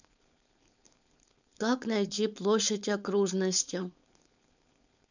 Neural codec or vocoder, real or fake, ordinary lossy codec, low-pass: codec, 16 kHz, 4.8 kbps, FACodec; fake; none; 7.2 kHz